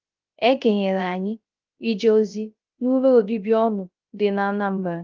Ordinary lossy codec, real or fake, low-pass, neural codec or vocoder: Opus, 24 kbps; fake; 7.2 kHz; codec, 16 kHz, 0.3 kbps, FocalCodec